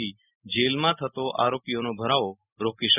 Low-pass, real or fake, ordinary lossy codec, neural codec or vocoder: 3.6 kHz; real; none; none